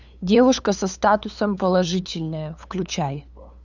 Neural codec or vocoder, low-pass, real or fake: codec, 16 kHz, 8 kbps, FunCodec, trained on LibriTTS, 25 frames a second; 7.2 kHz; fake